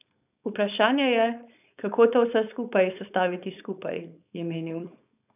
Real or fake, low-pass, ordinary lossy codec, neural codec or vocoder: fake; 3.6 kHz; none; codec, 16 kHz, 4.8 kbps, FACodec